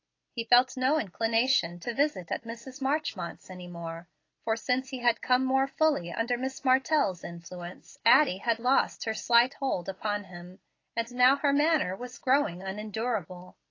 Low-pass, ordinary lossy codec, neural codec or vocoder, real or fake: 7.2 kHz; AAC, 32 kbps; none; real